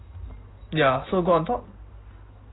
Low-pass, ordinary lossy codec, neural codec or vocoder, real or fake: 7.2 kHz; AAC, 16 kbps; none; real